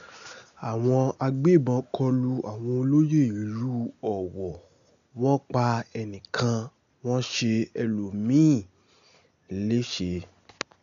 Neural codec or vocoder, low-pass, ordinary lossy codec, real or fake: none; 7.2 kHz; none; real